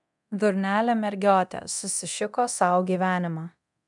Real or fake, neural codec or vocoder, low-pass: fake; codec, 24 kHz, 0.9 kbps, DualCodec; 10.8 kHz